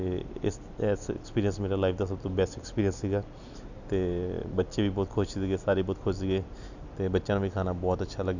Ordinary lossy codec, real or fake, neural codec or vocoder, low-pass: none; real; none; 7.2 kHz